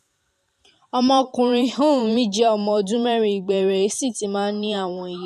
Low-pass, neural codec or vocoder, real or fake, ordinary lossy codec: 14.4 kHz; vocoder, 44.1 kHz, 128 mel bands every 512 samples, BigVGAN v2; fake; none